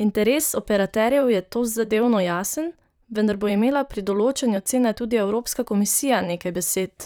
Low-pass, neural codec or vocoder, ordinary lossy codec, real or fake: none; vocoder, 44.1 kHz, 128 mel bands, Pupu-Vocoder; none; fake